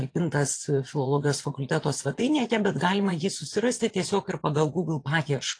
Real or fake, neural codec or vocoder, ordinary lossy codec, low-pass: fake; vocoder, 22.05 kHz, 80 mel bands, Vocos; AAC, 48 kbps; 9.9 kHz